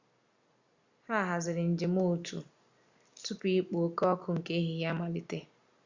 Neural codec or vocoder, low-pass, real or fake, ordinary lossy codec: none; 7.2 kHz; real; Opus, 64 kbps